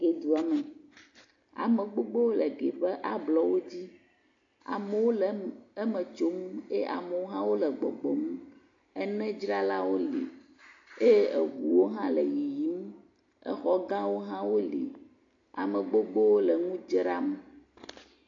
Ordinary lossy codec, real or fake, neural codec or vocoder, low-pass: AAC, 48 kbps; real; none; 7.2 kHz